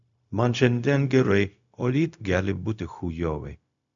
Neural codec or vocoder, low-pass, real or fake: codec, 16 kHz, 0.4 kbps, LongCat-Audio-Codec; 7.2 kHz; fake